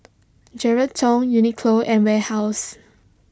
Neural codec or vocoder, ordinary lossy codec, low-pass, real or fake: none; none; none; real